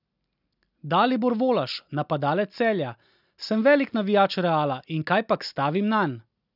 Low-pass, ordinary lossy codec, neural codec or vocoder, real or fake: 5.4 kHz; none; none; real